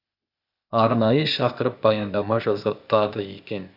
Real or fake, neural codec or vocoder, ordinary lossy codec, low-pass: fake; codec, 16 kHz, 0.8 kbps, ZipCodec; none; 5.4 kHz